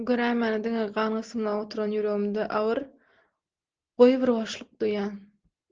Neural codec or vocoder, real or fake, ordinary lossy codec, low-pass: none; real; Opus, 16 kbps; 7.2 kHz